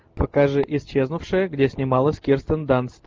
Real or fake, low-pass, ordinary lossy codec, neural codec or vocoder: real; 7.2 kHz; Opus, 24 kbps; none